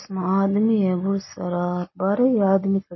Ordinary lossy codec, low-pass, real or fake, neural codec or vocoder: MP3, 24 kbps; 7.2 kHz; real; none